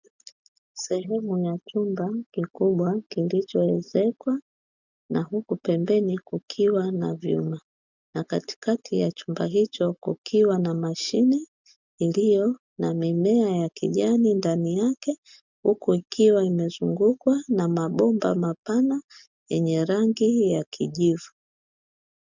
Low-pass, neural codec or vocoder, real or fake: 7.2 kHz; none; real